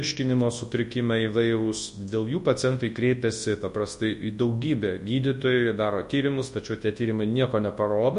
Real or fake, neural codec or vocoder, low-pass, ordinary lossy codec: fake; codec, 24 kHz, 0.9 kbps, WavTokenizer, large speech release; 10.8 kHz; MP3, 48 kbps